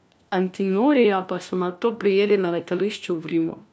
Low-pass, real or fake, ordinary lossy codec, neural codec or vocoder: none; fake; none; codec, 16 kHz, 1 kbps, FunCodec, trained on LibriTTS, 50 frames a second